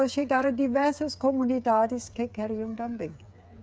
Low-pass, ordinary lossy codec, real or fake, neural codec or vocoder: none; none; fake; codec, 16 kHz, 8 kbps, FreqCodec, smaller model